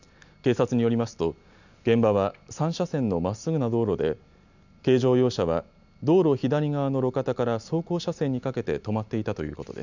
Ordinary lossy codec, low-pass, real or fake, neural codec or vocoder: none; 7.2 kHz; real; none